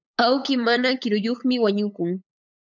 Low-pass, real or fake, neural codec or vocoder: 7.2 kHz; fake; codec, 16 kHz, 8 kbps, FunCodec, trained on LibriTTS, 25 frames a second